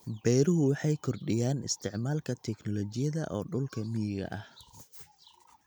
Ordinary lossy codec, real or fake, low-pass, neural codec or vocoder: none; real; none; none